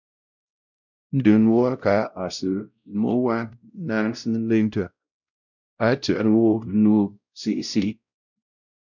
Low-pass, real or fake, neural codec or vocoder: 7.2 kHz; fake; codec, 16 kHz, 0.5 kbps, X-Codec, WavLM features, trained on Multilingual LibriSpeech